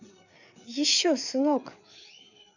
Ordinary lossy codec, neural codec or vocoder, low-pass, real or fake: none; codec, 16 kHz, 4 kbps, FreqCodec, larger model; 7.2 kHz; fake